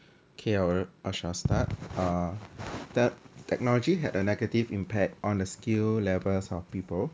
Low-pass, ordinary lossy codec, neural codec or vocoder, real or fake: none; none; none; real